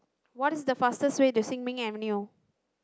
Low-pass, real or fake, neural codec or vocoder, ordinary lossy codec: none; real; none; none